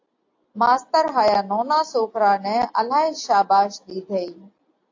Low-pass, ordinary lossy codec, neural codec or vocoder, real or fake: 7.2 kHz; AAC, 48 kbps; none; real